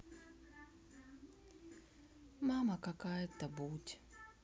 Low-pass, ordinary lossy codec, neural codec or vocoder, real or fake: none; none; none; real